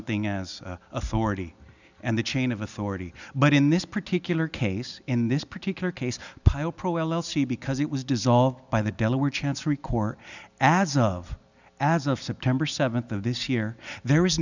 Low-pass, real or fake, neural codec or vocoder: 7.2 kHz; real; none